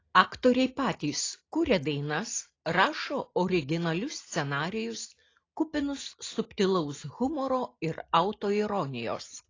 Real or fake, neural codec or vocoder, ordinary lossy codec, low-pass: real; none; AAC, 32 kbps; 7.2 kHz